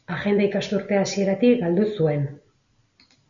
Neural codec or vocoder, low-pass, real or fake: none; 7.2 kHz; real